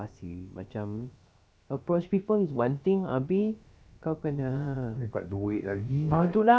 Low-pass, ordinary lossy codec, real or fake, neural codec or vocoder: none; none; fake; codec, 16 kHz, about 1 kbps, DyCAST, with the encoder's durations